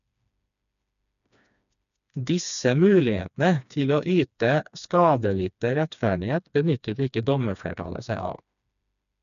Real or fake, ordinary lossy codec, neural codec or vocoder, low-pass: fake; none; codec, 16 kHz, 2 kbps, FreqCodec, smaller model; 7.2 kHz